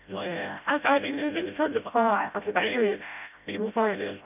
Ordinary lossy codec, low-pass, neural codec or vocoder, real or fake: none; 3.6 kHz; codec, 16 kHz, 0.5 kbps, FreqCodec, smaller model; fake